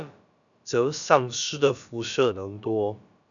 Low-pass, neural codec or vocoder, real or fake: 7.2 kHz; codec, 16 kHz, about 1 kbps, DyCAST, with the encoder's durations; fake